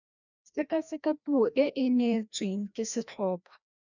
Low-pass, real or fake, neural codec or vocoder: 7.2 kHz; fake; codec, 16 kHz, 1 kbps, FreqCodec, larger model